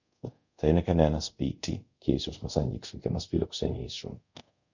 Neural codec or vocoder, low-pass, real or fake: codec, 24 kHz, 0.5 kbps, DualCodec; 7.2 kHz; fake